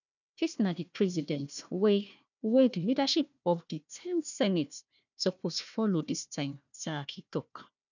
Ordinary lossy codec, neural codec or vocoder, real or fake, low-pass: none; codec, 16 kHz, 1 kbps, FunCodec, trained on Chinese and English, 50 frames a second; fake; 7.2 kHz